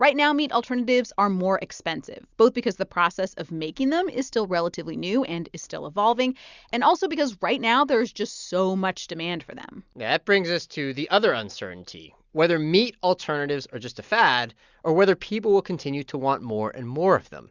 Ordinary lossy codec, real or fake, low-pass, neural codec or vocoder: Opus, 64 kbps; real; 7.2 kHz; none